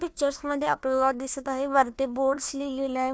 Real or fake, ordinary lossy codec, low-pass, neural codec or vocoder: fake; none; none; codec, 16 kHz, 1 kbps, FunCodec, trained on Chinese and English, 50 frames a second